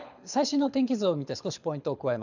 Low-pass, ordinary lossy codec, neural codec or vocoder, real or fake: 7.2 kHz; none; codec, 24 kHz, 6 kbps, HILCodec; fake